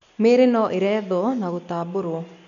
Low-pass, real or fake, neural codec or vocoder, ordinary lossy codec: 7.2 kHz; real; none; none